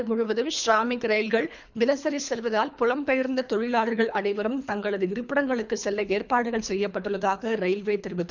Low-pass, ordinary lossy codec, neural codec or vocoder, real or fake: 7.2 kHz; none; codec, 24 kHz, 3 kbps, HILCodec; fake